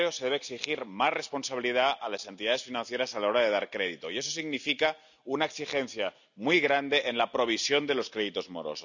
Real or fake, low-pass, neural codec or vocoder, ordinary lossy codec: real; 7.2 kHz; none; none